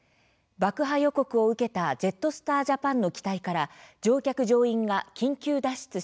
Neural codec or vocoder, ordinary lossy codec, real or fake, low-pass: none; none; real; none